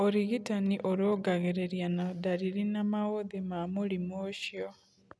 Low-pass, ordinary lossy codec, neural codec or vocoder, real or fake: none; none; none; real